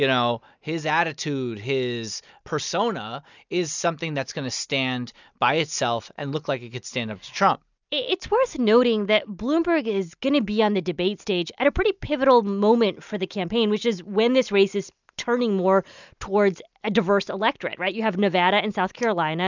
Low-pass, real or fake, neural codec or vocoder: 7.2 kHz; real; none